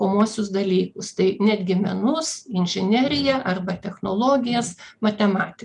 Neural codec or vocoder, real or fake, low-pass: none; real; 10.8 kHz